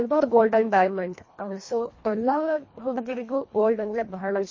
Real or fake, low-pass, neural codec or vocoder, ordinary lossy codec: fake; 7.2 kHz; codec, 24 kHz, 1.5 kbps, HILCodec; MP3, 32 kbps